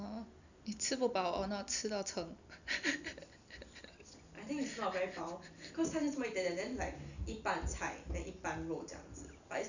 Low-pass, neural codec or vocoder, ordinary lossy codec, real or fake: 7.2 kHz; none; none; real